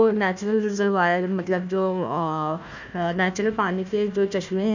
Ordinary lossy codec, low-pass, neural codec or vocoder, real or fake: none; 7.2 kHz; codec, 16 kHz, 1 kbps, FunCodec, trained on Chinese and English, 50 frames a second; fake